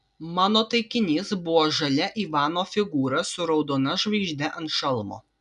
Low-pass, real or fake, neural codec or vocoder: 10.8 kHz; real; none